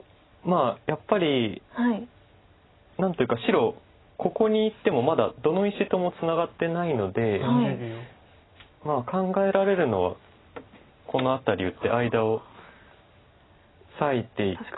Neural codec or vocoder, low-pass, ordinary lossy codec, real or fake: none; 7.2 kHz; AAC, 16 kbps; real